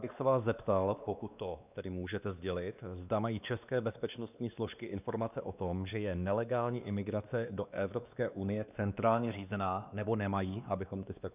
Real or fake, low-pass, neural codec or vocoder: fake; 3.6 kHz; codec, 16 kHz, 2 kbps, X-Codec, WavLM features, trained on Multilingual LibriSpeech